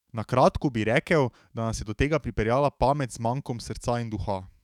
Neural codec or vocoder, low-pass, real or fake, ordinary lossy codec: autoencoder, 48 kHz, 128 numbers a frame, DAC-VAE, trained on Japanese speech; 19.8 kHz; fake; none